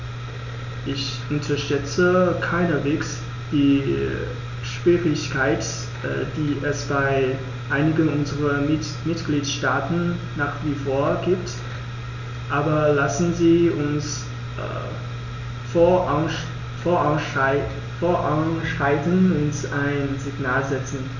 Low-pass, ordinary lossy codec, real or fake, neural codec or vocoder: 7.2 kHz; none; real; none